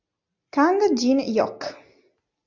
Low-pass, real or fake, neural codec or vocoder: 7.2 kHz; real; none